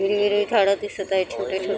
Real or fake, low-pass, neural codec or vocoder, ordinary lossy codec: real; none; none; none